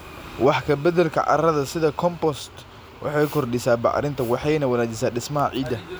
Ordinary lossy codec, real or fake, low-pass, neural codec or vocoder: none; real; none; none